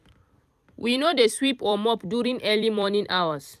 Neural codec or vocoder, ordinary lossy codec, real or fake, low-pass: vocoder, 44.1 kHz, 128 mel bands every 256 samples, BigVGAN v2; none; fake; 14.4 kHz